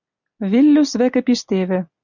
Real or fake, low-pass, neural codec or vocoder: real; 7.2 kHz; none